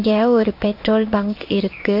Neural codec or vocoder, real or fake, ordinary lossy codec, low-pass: codec, 16 kHz in and 24 kHz out, 1 kbps, XY-Tokenizer; fake; none; 5.4 kHz